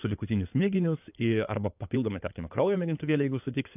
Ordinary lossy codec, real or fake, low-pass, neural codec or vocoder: AAC, 32 kbps; fake; 3.6 kHz; codec, 16 kHz in and 24 kHz out, 2.2 kbps, FireRedTTS-2 codec